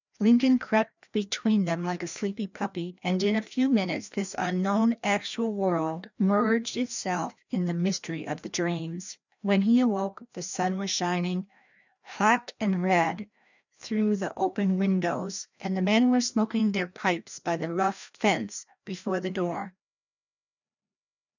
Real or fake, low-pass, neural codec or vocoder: fake; 7.2 kHz; codec, 16 kHz, 1 kbps, FreqCodec, larger model